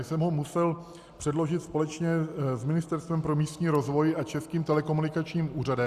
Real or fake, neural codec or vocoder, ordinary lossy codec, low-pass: real; none; Opus, 64 kbps; 14.4 kHz